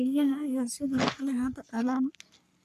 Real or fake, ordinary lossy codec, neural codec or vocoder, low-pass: fake; AAC, 96 kbps; codec, 44.1 kHz, 3.4 kbps, Pupu-Codec; 14.4 kHz